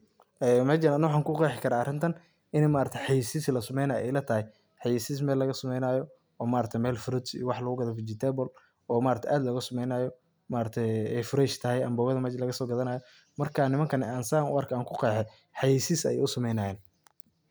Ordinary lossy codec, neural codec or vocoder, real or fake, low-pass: none; none; real; none